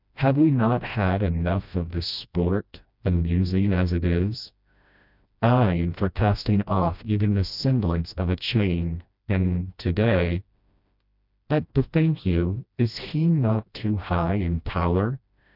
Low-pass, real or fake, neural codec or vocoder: 5.4 kHz; fake; codec, 16 kHz, 1 kbps, FreqCodec, smaller model